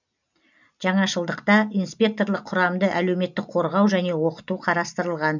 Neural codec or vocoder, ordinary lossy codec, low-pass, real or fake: none; none; 7.2 kHz; real